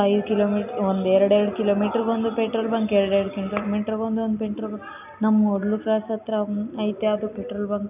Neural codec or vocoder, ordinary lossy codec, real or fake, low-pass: none; none; real; 3.6 kHz